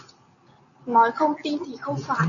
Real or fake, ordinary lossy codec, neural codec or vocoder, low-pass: real; MP3, 96 kbps; none; 7.2 kHz